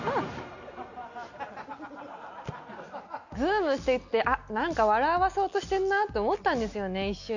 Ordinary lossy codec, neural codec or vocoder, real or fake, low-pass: none; none; real; 7.2 kHz